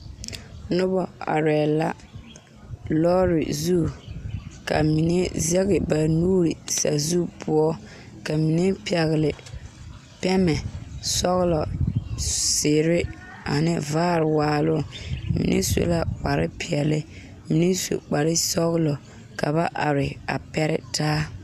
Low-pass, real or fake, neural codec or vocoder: 14.4 kHz; real; none